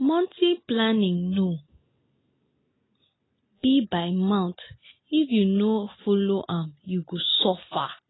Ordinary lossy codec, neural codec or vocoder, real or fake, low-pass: AAC, 16 kbps; none; real; 7.2 kHz